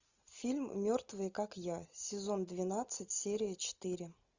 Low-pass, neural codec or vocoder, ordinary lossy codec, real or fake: 7.2 kHz; none; Opus, 64 kbps; real